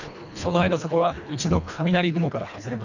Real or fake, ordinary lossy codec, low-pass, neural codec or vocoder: fake; none; 7.2 kHz; codec, 24 kHz, 1.5 kbps, HILCodec